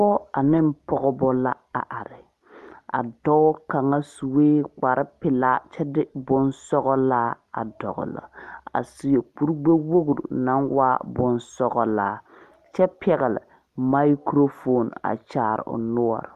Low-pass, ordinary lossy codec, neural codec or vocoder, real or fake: 14.4 kHz; Opus, 32 kbps; none; real